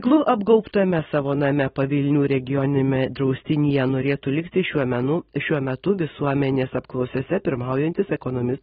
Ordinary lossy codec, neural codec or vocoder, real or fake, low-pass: AAC, 16 kbps; none; real; 19.8 kHz